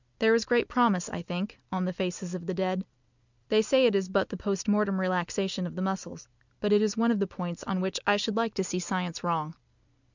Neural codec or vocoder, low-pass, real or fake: none; 7.2 kHz; real